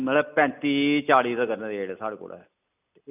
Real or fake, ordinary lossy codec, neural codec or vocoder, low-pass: real; none; none; 3.6 kHz